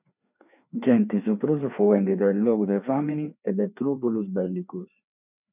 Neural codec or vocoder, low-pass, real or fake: codec, 16 kHz, 2 kbps, FreqCodec, larger model; 3.6 kHz; fake